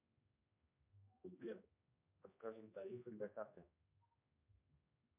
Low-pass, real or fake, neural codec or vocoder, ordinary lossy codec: 3.6 kHz; fake; codec, 16 kHz, 1 kbps, X-Codec, HuBERT features, trained on general audio; MP3, 32 kbps